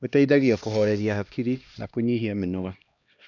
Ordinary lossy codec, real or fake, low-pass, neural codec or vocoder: none; fake; 7.2 kHz; codec, 16 kHz, 1 kbps, X-Codec, HuBERT features, trained on LibriSpeech